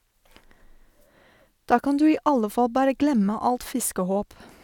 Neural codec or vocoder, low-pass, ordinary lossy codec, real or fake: none; 19.8 kHz; none; real